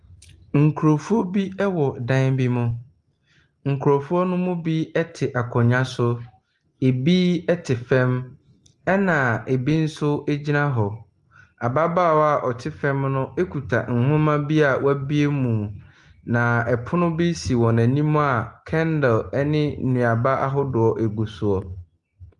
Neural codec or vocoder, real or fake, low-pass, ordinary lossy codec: none; real; 10.8 kHz; Opus, 24 kbps